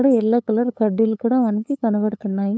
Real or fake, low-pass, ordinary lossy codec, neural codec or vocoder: fake; none; none; codec, 16 kHz, 8 kbps, FunCodec, trained on LibriTTS, 25 frames a second